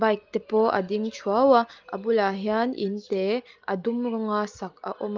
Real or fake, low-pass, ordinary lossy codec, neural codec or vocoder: real; 7.2 kHz; Opus, 32 kbps; none